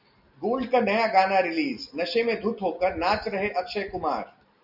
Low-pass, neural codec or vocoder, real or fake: 5.4 kHz; none; real